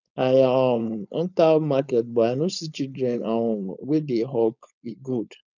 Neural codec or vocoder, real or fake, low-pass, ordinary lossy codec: codec, 16 kHz, 4.8 kbps, FACodec; fake; 7.2 kHz; none